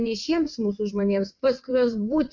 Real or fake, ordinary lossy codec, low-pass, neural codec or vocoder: fake; MP3, 48 kbps; 7.2 kHz; vocoder, 22.05 kHz, 80 mel bands, WaveNeXt